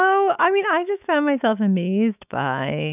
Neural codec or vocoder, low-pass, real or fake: autoencoder, 48 kHz, 128 numbers a frame, DAC-VAE, trained on Japanese speech; 3.6 kHz; fake